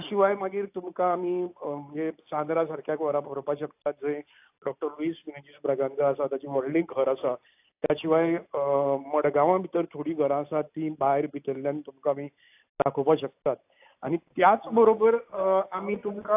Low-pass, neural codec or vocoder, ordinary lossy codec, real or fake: 3.6 kHz; none; AAC, 32 kbps; real